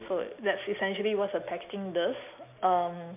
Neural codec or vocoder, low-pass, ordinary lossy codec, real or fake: none; 3.6 kHz; MP3, 32 kbps; real